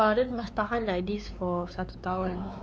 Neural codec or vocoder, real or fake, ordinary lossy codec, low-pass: codec, 16 kHz, 4 kbps, X-Codec, WavLM features, trained on Multilingual LibriSpeech; fake; none; none